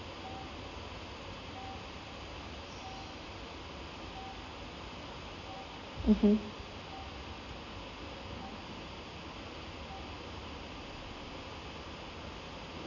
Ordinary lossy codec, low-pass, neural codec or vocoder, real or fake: none; 7.2 kHz; none; real